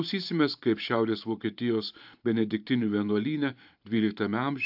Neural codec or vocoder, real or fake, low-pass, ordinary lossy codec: vocoder, 44.1 kHz, 128 mel bands every 512 samples, BigVGAN v2; fake; 5.4 kHz; AAC, 48 kbps